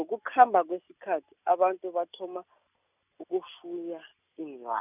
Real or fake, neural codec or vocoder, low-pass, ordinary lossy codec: real; none; 3.6 kHz; none